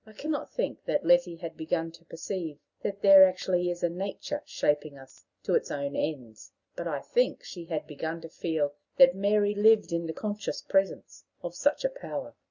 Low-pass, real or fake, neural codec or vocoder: 7.2 kHz; real; none